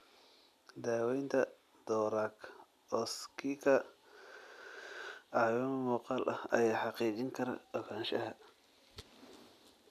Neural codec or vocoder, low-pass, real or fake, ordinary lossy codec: none; 14.4 kHz; real; MP3, 96 kbps